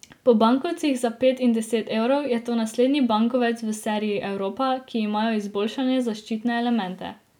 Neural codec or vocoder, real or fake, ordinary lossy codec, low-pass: none; real; none; 19.8 kHz